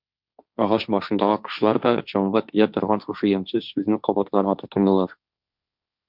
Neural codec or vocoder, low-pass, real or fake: codec, 16 kHz, 1.1 kbps, Voila-Tokenizer; 5.4 kHz; fake